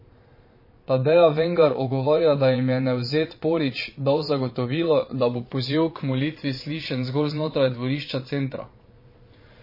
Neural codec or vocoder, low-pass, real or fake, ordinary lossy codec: vocoder, 22.05 kHz, 80 mel bands, Vocos; 5.4 kHz; fake; MP3, 24 kbps